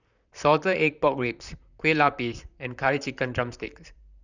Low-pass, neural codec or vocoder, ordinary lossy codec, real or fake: 7.2 kHz; vocoder, 44.1 kHz, 128 mel bands, Pupu-Vocoder; none; fake